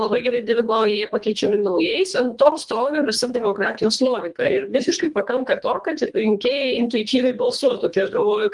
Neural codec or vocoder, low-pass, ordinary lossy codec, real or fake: codec, 24 kHz, 1.5 kbps, HILCodec; 10.8 kHz; Opus, 32 kbps; fake